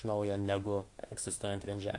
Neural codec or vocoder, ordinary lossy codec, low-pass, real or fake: autoencoder, 48 kHz, 32 numbers a frame, DAC-VAE, trained on Japanese speech; AAC, 48 kbps; 10.8 kHz; fake